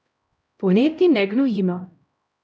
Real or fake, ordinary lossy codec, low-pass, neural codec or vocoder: fake; none; none; codec, 16 kHz, 0.5 kbps, X-Codec, HuBERT features, trained on LibriSpeech